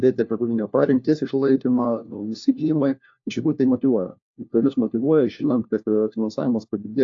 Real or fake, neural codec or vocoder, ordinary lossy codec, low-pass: fake; codec, 16 kHz, 1 kbps, FunCodec, trained on LibriTTS, 50 frames a second; AAC, 48 kbps; 7.2 kHz